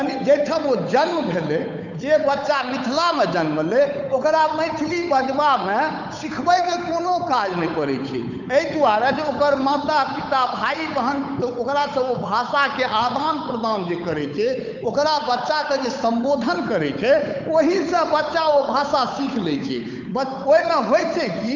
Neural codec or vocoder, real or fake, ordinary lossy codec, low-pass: codec, 16 kHz, 8 kbps, FunCodec, trained on Chinese and English, 25 frames a second; fake; none; 7.2 kHz